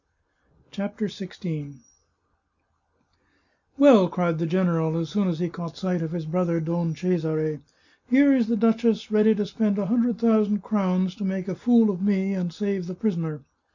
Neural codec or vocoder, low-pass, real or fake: none; 7.2 kHz; real